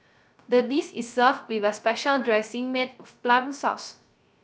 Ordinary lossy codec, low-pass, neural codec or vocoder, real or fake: none; none; codec, 16 kHz, 0.3 kbps, FocalCodec; fake